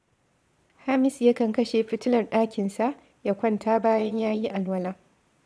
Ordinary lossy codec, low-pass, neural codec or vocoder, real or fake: none; none; vocoder, 22.05 kHz, 80 mel bands, Vocos; fake